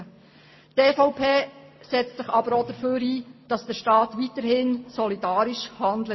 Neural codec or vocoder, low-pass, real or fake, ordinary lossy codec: none; 7.2 kHz; real; MP3, 24 kbps